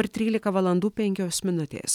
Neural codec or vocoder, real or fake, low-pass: none; real; 19.8 kHz